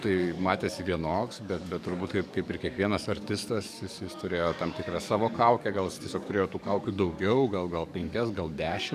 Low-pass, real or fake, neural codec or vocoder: 14.4 kHz; fake; codec, 44.1 kHz, 7.8 kbps, DAC